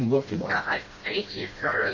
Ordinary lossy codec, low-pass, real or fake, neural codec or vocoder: MP3, 32 kbps; 7.2 kHz; fake; codec, 16 kHz, 1 kbps, FreqCodec, smaller model